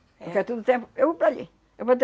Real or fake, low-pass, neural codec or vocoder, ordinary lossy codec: real; none; none; none